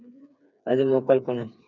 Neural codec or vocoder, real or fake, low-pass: codec, 16 kHz, 4 kbps, FreqCodec, smaller model; fake; 7.2 kHz